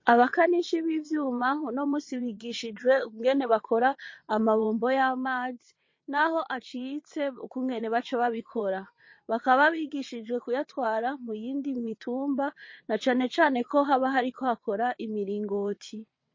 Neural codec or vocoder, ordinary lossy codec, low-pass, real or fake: vocoder, 22.05 kHz, 80 mel bands, WaveNeXt; MP3, 32 kbps; 7.2 kHz; fake